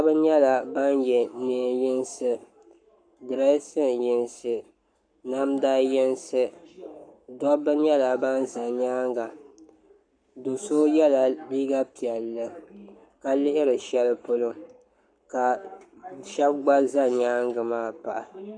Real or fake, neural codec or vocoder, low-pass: fake; codec, 44.1 kHz, 7.8 kbps, Pupu-Codec; 9.9 kHz